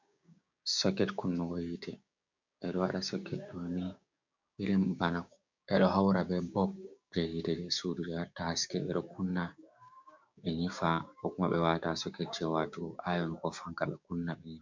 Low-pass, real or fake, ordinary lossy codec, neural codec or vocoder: 7.2 kHz; fake; MP3, 64 kbps; codec, 16 kHz, 6 kbps, DAC